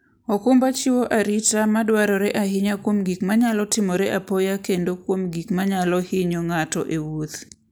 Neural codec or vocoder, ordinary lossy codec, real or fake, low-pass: none; none; real; none